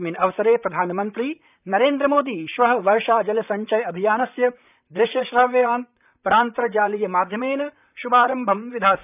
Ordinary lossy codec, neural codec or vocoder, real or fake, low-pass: none; vocoder, 44.1 kHz, 128 mel bands, Pupu-Vocoder; fake; 3.6 kHz